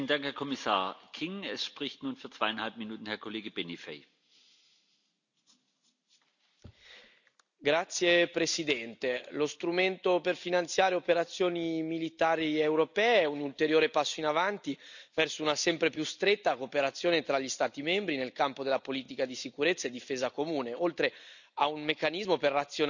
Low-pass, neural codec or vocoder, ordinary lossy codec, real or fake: 7.2 kHz; none; none; real